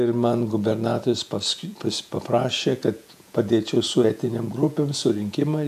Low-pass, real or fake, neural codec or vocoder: 14.4 kHz; fake; vocoder, 48 kHz, 128 mel bands, Vocos